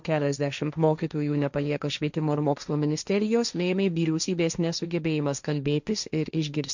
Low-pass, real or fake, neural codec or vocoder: 7.2 kHz; fake; codec, 16 kHz, 1.1 kbps, Voila-Tokenizer